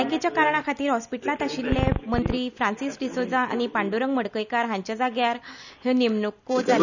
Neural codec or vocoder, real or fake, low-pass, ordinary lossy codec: none; real; 7.2 kHz; none